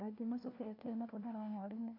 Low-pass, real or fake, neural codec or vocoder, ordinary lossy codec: 5.4 kHz; fake; codec, 16 kHz, 1 kbps, FunCodec, trained on LibriTTS, 50 frames a second; MP3, 48 kbps